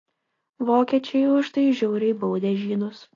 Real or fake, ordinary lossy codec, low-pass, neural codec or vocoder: real; AAC, 32 kbps; 7.2 kHz; none